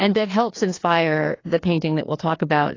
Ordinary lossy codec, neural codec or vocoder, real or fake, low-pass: AAC, 32 kbps; codec, 16 kHz, 2 kbps, FreqCodec, larger model; fake; 7.2 kHz